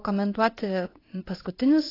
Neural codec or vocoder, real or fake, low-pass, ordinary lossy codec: codec, 16 kHz in and 24 kHz out, 1 kbps, XY-Tokenizer; fake; 5.4 kHz; AAC, 24 kbps